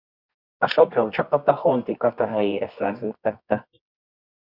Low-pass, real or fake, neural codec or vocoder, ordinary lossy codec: 5.4 kHz; fake; codec, 24 kHz, 0.9 kbps, WavTokenizer, medium music audio release; Opus, 64 kbps